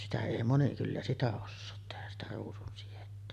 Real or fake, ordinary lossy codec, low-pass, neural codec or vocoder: real; none; 14.4 kHz; none